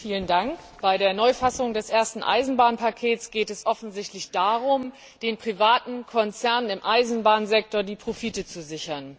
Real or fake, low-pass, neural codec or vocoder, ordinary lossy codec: real; none; none; none